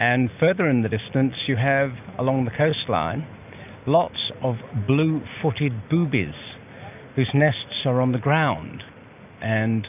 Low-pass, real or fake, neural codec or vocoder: 3.6 kHz; real; none